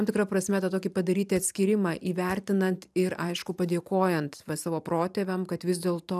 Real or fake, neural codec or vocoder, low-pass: fake; vocoder, 44.1 kHz, 128 mel bands every 512 samples, BigVGAN v2; 14.4 kHz